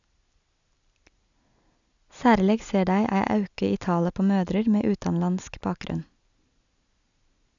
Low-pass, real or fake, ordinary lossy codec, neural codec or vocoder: 7.2 kHz; real; none; none